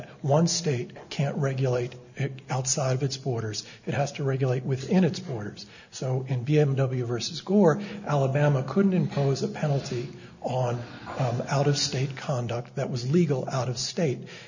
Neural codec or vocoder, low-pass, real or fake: none; 7.2 kHz; real